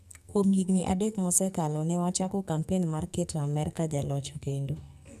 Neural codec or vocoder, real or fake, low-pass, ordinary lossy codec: codec, 44.1 kHz, 2.6 kbps, SNAC; fake; 14.4 kHz; none